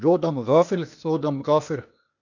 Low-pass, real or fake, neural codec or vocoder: 7.2 kHz; fake; codec, 16 kHz, 0.8 kbps, ZipCodec